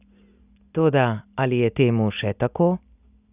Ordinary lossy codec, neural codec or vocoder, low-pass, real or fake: none; none; 3.6 kHz; real